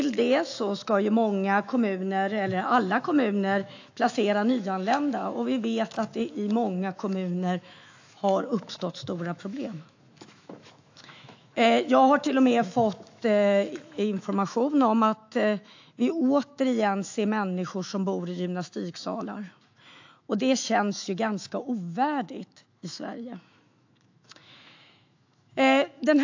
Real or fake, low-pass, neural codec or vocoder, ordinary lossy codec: fake; 7.2 kHz; autoencoder, 48 kHz, 128 numbers a frame, DAC-VAE, trained on Japanese speech; AAC, 48 kbps